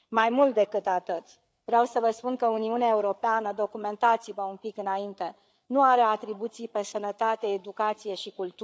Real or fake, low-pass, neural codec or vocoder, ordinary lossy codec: fake; none; codec, 16 kHz, 8 kbps, FreqCodec, larger model; none